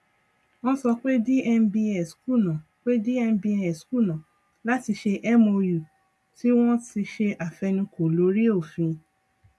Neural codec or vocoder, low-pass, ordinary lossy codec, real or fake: none; none; none; real